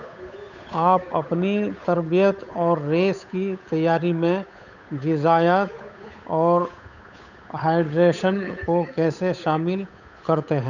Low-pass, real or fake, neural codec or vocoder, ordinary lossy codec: 7.2 kHz; fake; codec, 16 kHz, 8 kbps, FunCodec, trained on Chinese and English, 25 frames a second; none